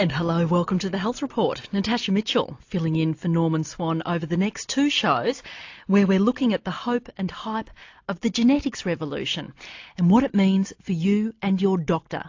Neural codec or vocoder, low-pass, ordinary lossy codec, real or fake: none; 7.2 kHz; AAC, 48 kbps; real